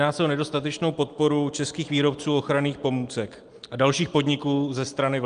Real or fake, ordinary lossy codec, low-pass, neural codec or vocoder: real; Opus, 32 kbps; 9.9 kHz; none